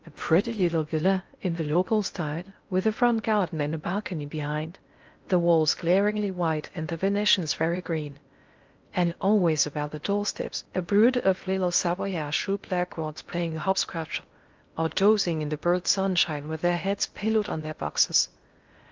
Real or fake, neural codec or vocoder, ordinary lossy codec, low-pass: fake; codec, 16 kHz in and 24 kHz out, 0.6 kbps, FocalCodec, streaming, 2048 codes; Opus, 24 kbps; 7.2 kHz